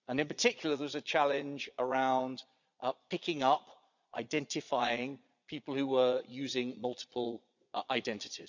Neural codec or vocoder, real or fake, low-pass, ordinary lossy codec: vocoder, 22.05 kHz, 80 mel bands, Vocos; fake; 7.2 kHz; none